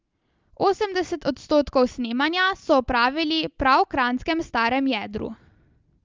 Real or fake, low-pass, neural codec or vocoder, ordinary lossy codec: real; 7.2 kHz; none; Opus, 32 kbps